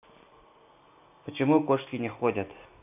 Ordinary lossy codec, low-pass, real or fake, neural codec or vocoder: none; 3.6 kHz; real; none